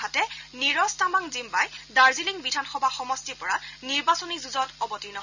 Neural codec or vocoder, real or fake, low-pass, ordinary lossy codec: none; real; 7.2 kHz; none